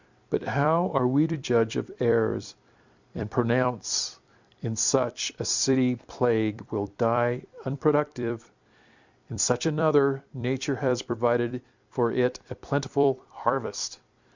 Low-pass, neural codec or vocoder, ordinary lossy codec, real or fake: 7.2 kHz; vocoder, 44.1 kHz, 128 mel bands every 256 samples, BigVGAN v2; Opus, 64 kbps; fake